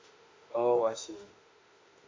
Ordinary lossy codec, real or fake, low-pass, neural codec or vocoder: MP3, 48 kbps; fake; 7.2 kHz; autoencoder, 48 kHz, 32 numbers a frame, DAC-VAE, trained on Japanese speech